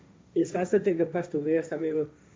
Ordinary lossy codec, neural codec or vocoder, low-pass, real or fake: none; codec, 16 kHz, 1.1 kbps, Voila-Tokenizer; none; fake